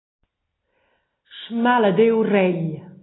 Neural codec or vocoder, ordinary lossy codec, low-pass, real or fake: none; AAC, 16 kbps; 7.2 kHz; real